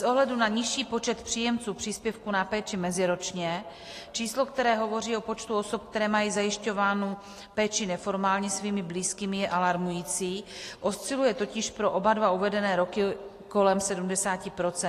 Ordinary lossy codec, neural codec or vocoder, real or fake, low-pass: AAC, 48 kbps; none; real; 14.4 kHz